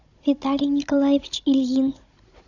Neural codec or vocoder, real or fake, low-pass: codec, 16 kHz, 16 kbps, FunCodec, trained on Chinese and English, 50 frames a second; fake; 7.2 kHz